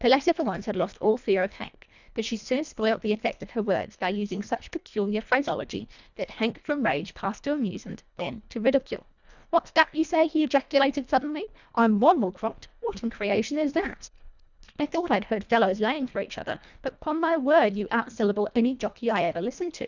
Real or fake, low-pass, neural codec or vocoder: fake; 7.2 kHz; codec, 24 kHz, 1.5 kbps, HILCodec